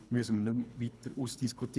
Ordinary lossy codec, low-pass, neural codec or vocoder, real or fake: none; none; codec, 24 kHz, 3 kbps, HILCodec; fake